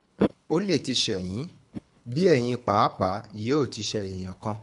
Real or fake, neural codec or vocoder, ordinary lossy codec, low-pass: fake; codec, 24 kHz, 3 kbps, HILCodec; none; 10.8 kHz